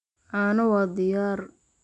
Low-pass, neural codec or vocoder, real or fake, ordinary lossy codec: 10.8 kHz; none; real; none